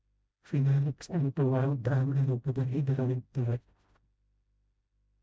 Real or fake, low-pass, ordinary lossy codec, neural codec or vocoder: fake; none; none; codec, 16 kHz, 0.5 kbps, FreqCodec, smaller model